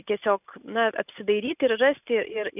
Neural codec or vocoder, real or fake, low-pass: none; real; 3.6 kHz